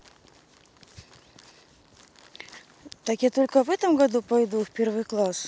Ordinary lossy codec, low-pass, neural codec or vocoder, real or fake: none; none; none; real